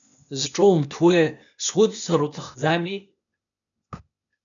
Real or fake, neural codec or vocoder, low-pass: fake; codec, 16 kHz, 0.8 kbps, ZipCodec; 7.2 kHz